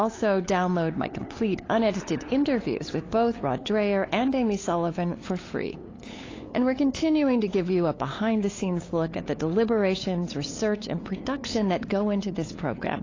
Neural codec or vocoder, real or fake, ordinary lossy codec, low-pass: codec, 16 kHz, 8 kbps, FunCodec, trained on LibriTTS, 25 frames a second; fake; AAC, 32 kbps; 7.2 kHz